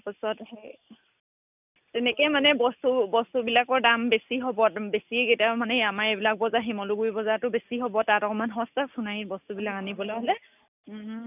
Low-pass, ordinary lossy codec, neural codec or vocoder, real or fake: 3.6 kHz; none; none; real